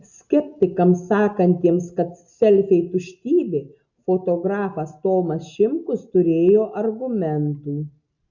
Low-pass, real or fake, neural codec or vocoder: 7.2 kHz; real; none